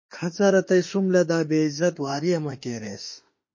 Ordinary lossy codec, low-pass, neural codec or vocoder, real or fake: MP3, 32 kbps; 7.2 kHz; autoencoder, 48 kHz, 32 numbers a frame, DAC-VAE, trained on Japanese speech; fake